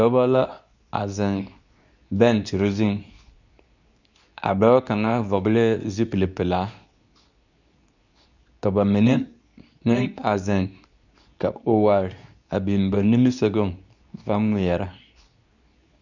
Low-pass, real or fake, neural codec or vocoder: 7.2 kHz; fake; codec, 24 kHz, 0.9 kbps, WavTokenizer, medium speech release version 2